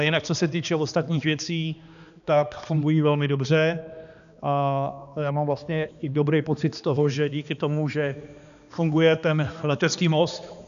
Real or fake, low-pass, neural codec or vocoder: fake; 7.2 kHz; codec, 16 kHz, 2 kbps, X-Codec, HuBERT features, trained on balanced general audio